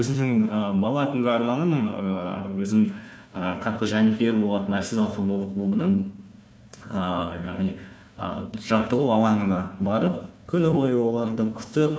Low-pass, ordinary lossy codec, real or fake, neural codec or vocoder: none; none; fake; codec, 16 kHz, 1 kbps, FunCodec, trained on Chinese and English, 50 frames a second